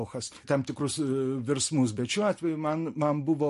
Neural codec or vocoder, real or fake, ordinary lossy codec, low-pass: none; real; MP3, 48 kbps; 14.4 kHz